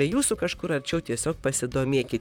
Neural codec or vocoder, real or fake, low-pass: vocoder, 44.1 kHz, 128 mel bands, Pupu-Vocoder; fake; 19.8 kHz